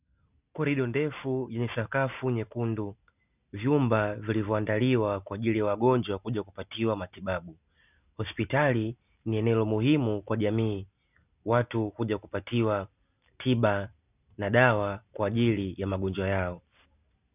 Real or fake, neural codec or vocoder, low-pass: real; none; 3.6 kHz